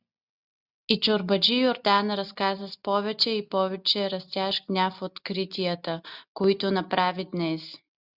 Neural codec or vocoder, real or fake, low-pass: none; real; 5.4 kHz